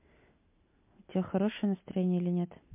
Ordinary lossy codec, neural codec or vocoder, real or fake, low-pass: MP3, 32 kbps; none; real; 3.6 kHz